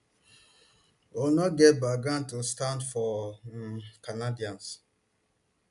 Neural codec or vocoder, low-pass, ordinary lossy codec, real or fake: none; 10.8 kHz; none; real